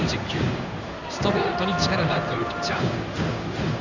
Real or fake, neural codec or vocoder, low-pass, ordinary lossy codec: fake; codec, 16 kHz in and 24 kHz out, 1 kbps, XY-Tokenizer; 7.2 kHz; none